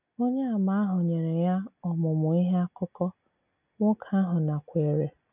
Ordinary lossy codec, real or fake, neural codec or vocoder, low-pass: none; real; none; 3.6 kHz